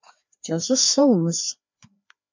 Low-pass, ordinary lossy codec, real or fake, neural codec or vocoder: 7.2 kHz; MP3, 64 kbps; fake; codec, 16 kHz, 2 kbps, FreqCodec, larger model